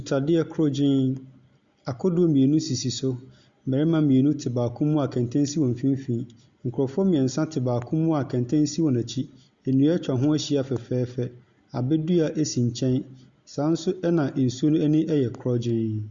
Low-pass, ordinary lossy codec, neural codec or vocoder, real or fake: 7.2 kHz; Opus, 64 kbps; none; real